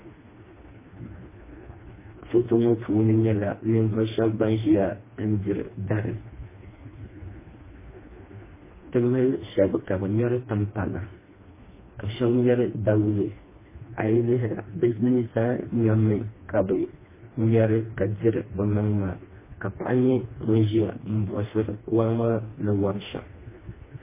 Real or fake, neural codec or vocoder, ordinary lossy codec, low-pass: fake; codec, 16 kHz, 2 kbps, FreqCodec, smaller model; MP3, 16 kbps; 3.6 kHz